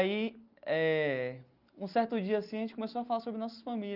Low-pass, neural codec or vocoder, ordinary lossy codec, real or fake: 5.4 kHz; none; Opus, 32 kbps; real